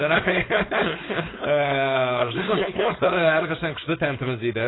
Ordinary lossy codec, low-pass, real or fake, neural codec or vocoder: AAC, 16 kbps; 7.2 kHz; fake; codec, 16 kHz, 4.8 kbps, FACodec